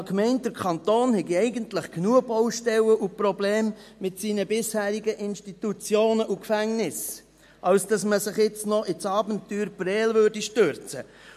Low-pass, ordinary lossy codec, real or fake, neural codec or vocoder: 14.4 kHz; MP3, 64 kbps; real; none